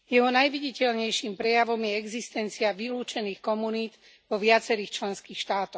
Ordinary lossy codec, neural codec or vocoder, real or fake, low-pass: none; none; real; none